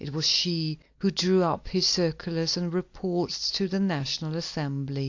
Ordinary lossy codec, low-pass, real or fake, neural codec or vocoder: AAC, 48 kbps; 7.2 kHz; real; none